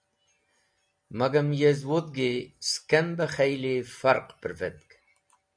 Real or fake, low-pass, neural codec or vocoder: real; 9.9 kHz; none